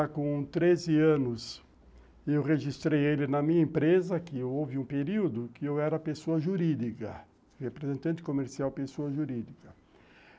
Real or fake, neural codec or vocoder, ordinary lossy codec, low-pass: real; none; none; none